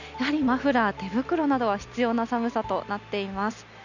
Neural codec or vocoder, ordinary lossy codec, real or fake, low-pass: none; none; real; 7.2 kHz